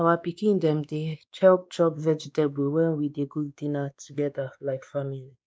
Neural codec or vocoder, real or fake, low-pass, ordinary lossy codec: codec, 16 kHz, 2 kbps, X-Codec, WavLM features, trained on Multilingual LibriSpeech; fake; none; none